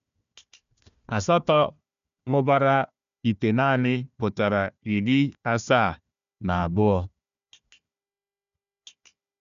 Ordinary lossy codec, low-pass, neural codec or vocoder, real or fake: none; 7.2 kHz; codec, 16 kHz, 1 kbps, FunCodec, trained on Chinese and English, 50 frames a second; fake